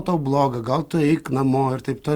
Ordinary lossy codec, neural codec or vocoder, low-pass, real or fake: Opus, 32 kbps; none; 19.8 kHz; real